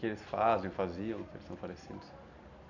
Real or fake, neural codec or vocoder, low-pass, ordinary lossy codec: real; none; 7.2 kHz; none